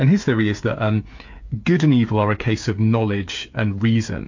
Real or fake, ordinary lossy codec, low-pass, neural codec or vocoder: fake; MP3, 48 kbps; 7.2 kHz; codec, 16 kHz, 8 kbps, FreqCodec, smaller model